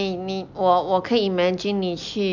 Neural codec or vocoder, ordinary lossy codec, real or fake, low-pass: none; none; real; 7.2 kHz